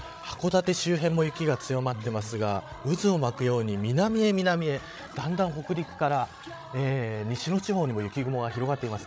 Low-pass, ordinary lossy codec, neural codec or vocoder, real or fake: none; none; codec, 16 kHz, 16 kbps, FreqCodec, larger model; fake